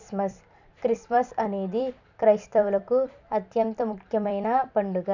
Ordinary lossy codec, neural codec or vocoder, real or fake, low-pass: none; vocoder, 44.1 kHz, 128 mel bands every 256 samples, BigVGAN v2; fake; 7.2 kHz